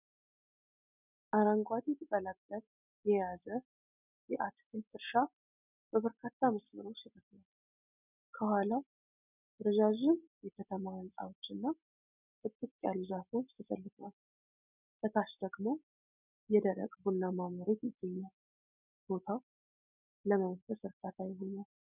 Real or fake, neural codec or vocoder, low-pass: real; none; 3.6 kHz